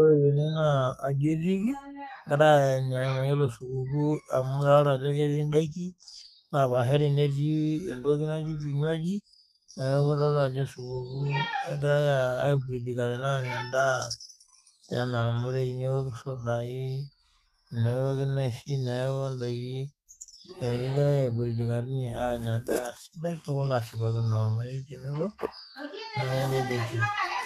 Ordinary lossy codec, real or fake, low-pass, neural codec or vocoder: none; fake; 14.4 kHz; codec, 32 kHz, 1.9 kbps, SNAC